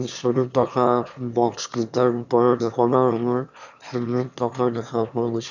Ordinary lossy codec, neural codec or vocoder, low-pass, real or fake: none; autoencoder, 22.05 kHz, a latent of 192 numbers a frame, VITS, trained on one speaker; 7.2 kHz; fake